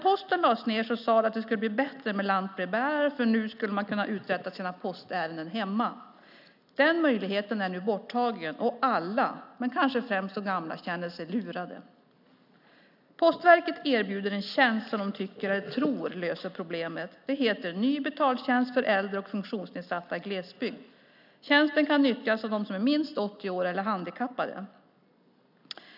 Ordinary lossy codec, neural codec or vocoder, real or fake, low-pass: none; none; real; 5.4 kHz